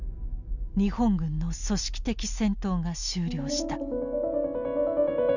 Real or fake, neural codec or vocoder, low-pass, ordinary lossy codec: real; none; 7.2 kHz; none